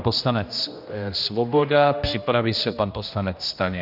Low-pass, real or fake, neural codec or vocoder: 5.4 kHz; fake; codec, 16 kHz, 1 kbps, X-Codec, HuBERT features, trained on general audio